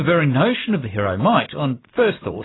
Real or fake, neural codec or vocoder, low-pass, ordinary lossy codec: real; none; 7.2 kHz; AAC, 16 kbps